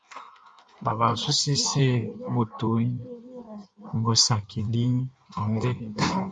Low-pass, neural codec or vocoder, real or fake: 9.9 kHz; codec, 16 kHz in and 24 kHz out, 1.1 kbps, FireRedTTS-2 codec; fake